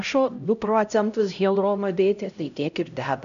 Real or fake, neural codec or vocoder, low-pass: fake; codec, 16 kHz, 0.5 kbps, X-Codec, HuBERT features, trained on LibriSpeech; 7.2 kHz